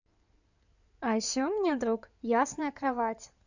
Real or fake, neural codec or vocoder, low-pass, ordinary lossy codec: fake; codec, 16 kHz in and 24 kHz out, 2.2 kbps, FireRedTTS-2 codec; 7.2 kHz; none